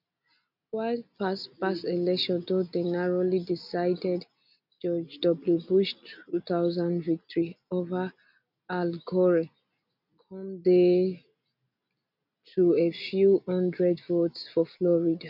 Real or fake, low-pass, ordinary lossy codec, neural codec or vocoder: real; 5.4 kHz; none; none